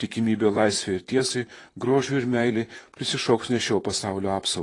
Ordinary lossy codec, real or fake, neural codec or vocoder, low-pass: AAC, 32 kbps; fake; vocoder, 44.1 kHz, 128 mel bands, Pupu-Vocoder; 10.8 kHz